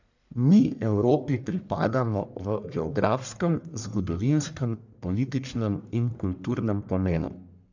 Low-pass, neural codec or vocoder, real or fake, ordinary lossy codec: 7.2 kHz; codec, 44.1 kHz, 1.7 kbps, Pupu-Codec; fake; none